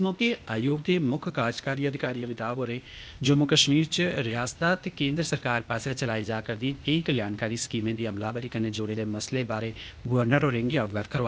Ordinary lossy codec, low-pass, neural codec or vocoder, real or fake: none; none; codec, 16 kHz, 0.8 kbps, ZipCodec; fake